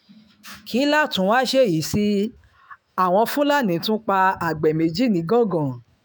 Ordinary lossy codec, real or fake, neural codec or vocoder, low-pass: none; fake; autoencoder, 48 kHz, 128 numbers a frame, DAC-VAE, trained on Japanese speech; none